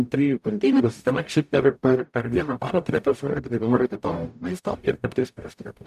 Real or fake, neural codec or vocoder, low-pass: fake; codec, 44.1 kHz, 0.9 kbps, DAC; 14.4 kHz